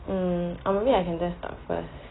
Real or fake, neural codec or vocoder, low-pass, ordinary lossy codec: real; none; 7.2 kHz; AAC, 16 kbps